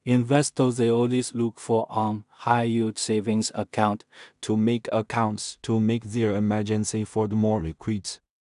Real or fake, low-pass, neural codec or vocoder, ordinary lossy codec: fake; 10.8 kHz; codec, 16 kHz in and 24 kHz out, 0.4 kbps, LongCat-Audio-Codec, two codebook decoder; none